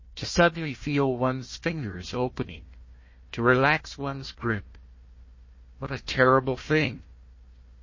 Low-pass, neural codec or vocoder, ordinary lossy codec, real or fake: 7.2 kHz; codec, 16 kHz, 1 kbps, FunCodec, trained on Chinese and English, 50 frames a second; MP3, 32 kbps; fake